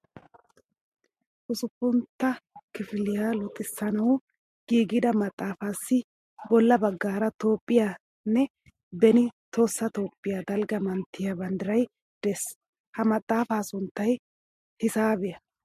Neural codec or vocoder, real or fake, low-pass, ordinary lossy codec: none; real; 14.4 kHz; MP3, 64 kbps